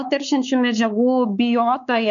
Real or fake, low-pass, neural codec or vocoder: fake; 7.2 kHz; codec, 16 kHz, 4 kbps, X-Codec, WavLM features, trained on Multilingual LibriSpeech